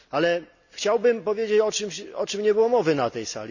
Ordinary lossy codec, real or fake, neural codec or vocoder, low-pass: none; real; none; 7.2 kHz